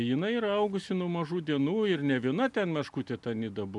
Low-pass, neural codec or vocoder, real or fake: 10.8 kHz; none; real